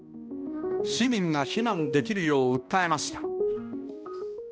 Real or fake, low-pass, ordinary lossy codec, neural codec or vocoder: fake; none; none; codec, 16 kHz, 1 kbps, X-Codec, HuBERT features, trained on balanced general audio